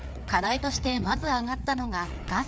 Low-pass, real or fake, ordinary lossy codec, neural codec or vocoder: none; fake; none; codec, 16 kHz, 4 kbps, FreqCodec, larger model